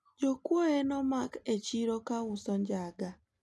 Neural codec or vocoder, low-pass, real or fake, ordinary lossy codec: none; none; real; none